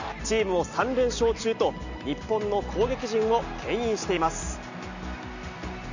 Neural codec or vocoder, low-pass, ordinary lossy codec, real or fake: none; 7.2 kHz; none; real